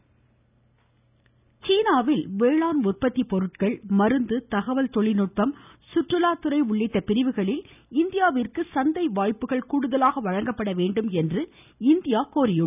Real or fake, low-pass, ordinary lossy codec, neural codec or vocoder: real; 3.6 kHz; none; none